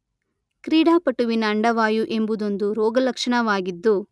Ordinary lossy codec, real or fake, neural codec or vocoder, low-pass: none; real; none; none